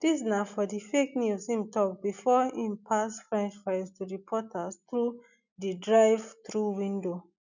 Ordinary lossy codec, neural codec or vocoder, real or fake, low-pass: none; none; real; 7.2 kHz